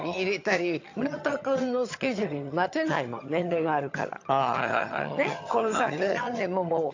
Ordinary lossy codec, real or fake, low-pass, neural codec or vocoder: AAC, 48 kbps; fake; 7.2 kHz; vocoder, 22.05 kHz, 80 mel bands, HiFi-GAN